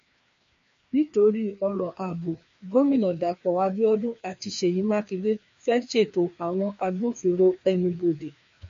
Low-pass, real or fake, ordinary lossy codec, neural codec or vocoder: 7.2 kHz; fake; MP3, 48 kbps; codec, 16 kHz, 2 kbps, FreqCodec, larger model